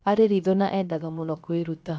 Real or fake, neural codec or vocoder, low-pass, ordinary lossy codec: fake; codec, 16 kHz, 0.7 kbps, FocalCodec; none; none